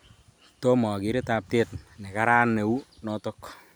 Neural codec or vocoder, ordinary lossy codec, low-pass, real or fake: none; none; none; real